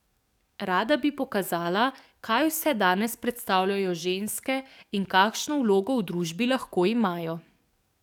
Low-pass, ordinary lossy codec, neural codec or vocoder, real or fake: 19.8 kHz; none; codec, 44.1 kHz, 7.8 kbps, DAC; fake